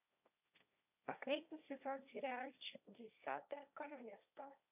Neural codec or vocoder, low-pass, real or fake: codec, 16 kHz, 1.1 kbps, Voila-Tokenizer; 3.6 kHz; fake